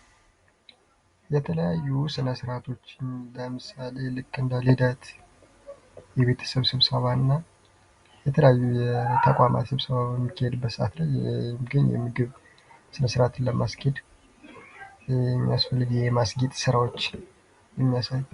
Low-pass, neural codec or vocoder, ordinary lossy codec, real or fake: 10.8 kHz; none; Opus, 64 kbps; real